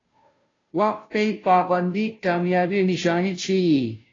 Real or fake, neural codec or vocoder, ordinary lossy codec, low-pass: fake; codec, 16 kHz, 0.5 kbps, FunCodec, trained on Chinese and English, 25 frames a second; AAC, 32 kbps; 7.2 kHz